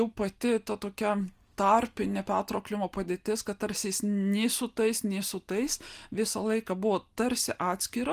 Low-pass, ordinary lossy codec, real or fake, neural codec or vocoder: 14.4 kHz; Opus, 24 kbps; real; none